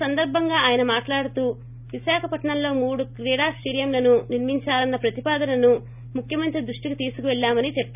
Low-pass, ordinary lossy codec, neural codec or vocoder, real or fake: 3.6 kHz; none; none; real